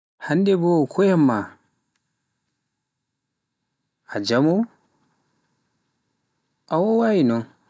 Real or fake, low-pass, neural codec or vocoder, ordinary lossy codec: real; none; none; none